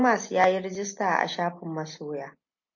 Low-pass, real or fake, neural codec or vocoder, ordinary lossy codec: 7.2 kHz; real; none; MP3, 32 kbps